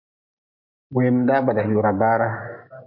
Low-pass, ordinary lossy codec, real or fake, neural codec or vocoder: 5.4 kHz; MP3, 48 kbps; real; none